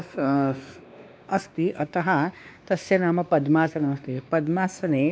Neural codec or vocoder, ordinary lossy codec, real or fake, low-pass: codec, 16 kHz, 2 kbps, X-Codec, WavLM features, trained on Multilingual LibriSpeech; none; fake; none